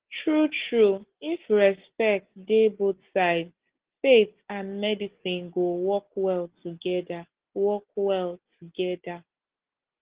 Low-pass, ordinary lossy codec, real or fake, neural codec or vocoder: 3.6 kHz; Opus, 16 kbps; real; none